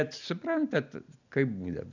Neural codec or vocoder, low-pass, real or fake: none; 7.2 kHz; real